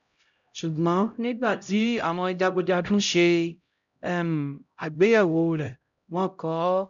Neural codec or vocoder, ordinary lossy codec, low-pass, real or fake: codec, 16 kHz, 0.5 kbps, X-Codec, HuBERT features, trained on LibriSpeech; none; 7.2 kHz; fake